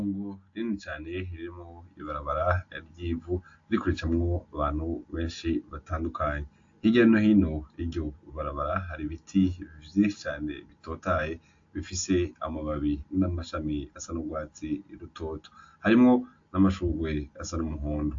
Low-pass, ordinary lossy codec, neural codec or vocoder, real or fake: 7.2 kHz; AAC, 48 kbps; none; real